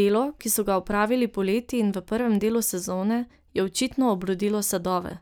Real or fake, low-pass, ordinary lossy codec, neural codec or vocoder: real; none; none; none